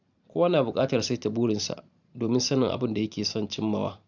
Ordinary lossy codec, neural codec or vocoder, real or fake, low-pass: none; vocoder, 44.1 kHz, 128 mel bands every 512 samples, BigVGAN v2; fake; 7.2 kHz